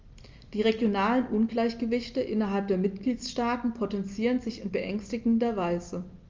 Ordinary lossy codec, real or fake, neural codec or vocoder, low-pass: Opus, 32 kbps; real; none; 7.2 kHz